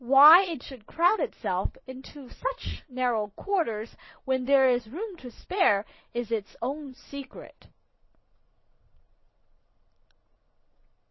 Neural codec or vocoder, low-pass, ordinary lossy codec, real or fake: vocoder, 22.05 kHz, 80 mel bands, Vocos; 7.2 kHz; MP3, 24 kbps; fake